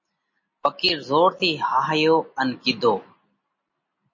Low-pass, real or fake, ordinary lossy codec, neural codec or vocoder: 7.2 kHz; real; MP3, 32 kbps; none